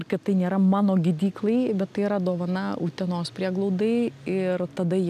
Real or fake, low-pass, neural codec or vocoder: real; 14.4 kHz; none